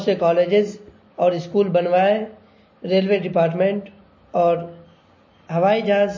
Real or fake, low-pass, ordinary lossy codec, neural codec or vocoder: real; 7.2 kHz; MP3, 32 kbps; none